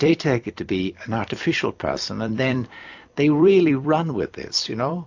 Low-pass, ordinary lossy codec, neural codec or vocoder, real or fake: 7.2 kHz; AAC, 48 kbps; vocoder, 44.1 kHz, 128 mel bands every 256 samples, BigVGAN v2; fake